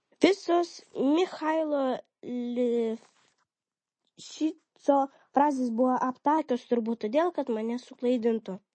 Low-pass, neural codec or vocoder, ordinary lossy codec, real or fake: 9.9 kHz; none; MP3, 32 kbps; real